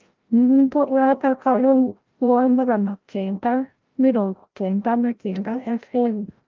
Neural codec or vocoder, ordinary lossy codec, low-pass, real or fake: codec, 16 kHz, 0.5 kbps, FreqCodec, larger model; Opus, 32 kbps; 7.2 kHz; fake